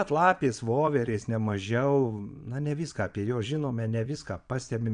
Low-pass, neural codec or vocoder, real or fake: 9.9 kHz; vocoder, 22.05 kHz, 80 mel bands, Vocos; fake